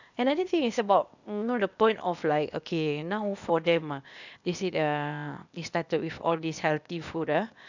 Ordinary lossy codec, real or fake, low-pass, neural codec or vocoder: none; fake; 7.2 kHz; codec, 16 kHz, 0.8 kbps, ZipCodec